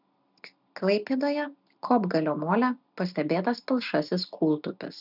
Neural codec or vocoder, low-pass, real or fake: none; 5.4 kHz; real